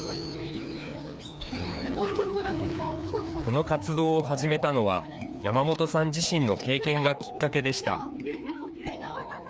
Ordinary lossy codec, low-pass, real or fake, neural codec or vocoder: none; none; fake; codec, 16 kHz, 2 kbps, FreqCodec, larger model